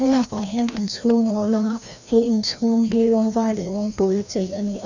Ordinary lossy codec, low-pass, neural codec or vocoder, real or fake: none; 7.2 kHz; codec, 16 kHz, 1 kbps, FreqCodec, larger model; fake